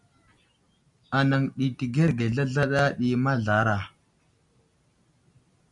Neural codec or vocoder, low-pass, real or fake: none; 10.8 kHz; real